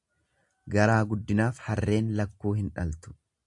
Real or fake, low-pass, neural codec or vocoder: real; 10.8 kHz; none